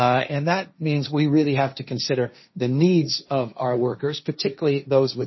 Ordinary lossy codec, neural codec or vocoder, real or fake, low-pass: MP3, 24 kbps; codec, 16 kHz, 1.1 kbps, Voila-Tokenizer; fake; 7.2 kHz